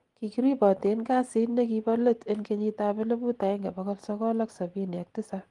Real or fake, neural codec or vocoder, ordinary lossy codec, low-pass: real; none; Opus, 24 kbps; 10.8 kHz